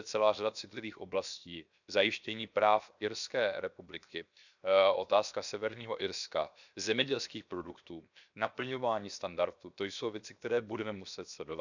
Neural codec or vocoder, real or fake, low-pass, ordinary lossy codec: codec, 16 kHz, 0.7 kbps, FocalCodec; fake; 7.2 kHz; none